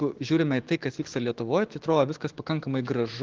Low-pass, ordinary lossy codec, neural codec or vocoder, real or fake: 7.2 kHz; Opus, 16 kbps; autoencoder, 48 kHz, 128 numbers a frame, DAC-VAE, trained on Japanese speech; fake